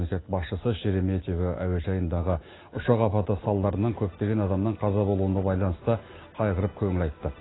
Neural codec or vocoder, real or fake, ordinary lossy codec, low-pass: none; real; AAC, 16 kbps; 7.2 kHz